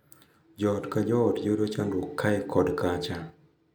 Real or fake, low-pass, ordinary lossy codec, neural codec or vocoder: real; none; none; none